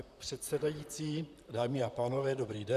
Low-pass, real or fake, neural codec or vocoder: 14.4 kHz; fake; vocoder, 44.1 kHz, 128 mel bands, Pupu-Vocoder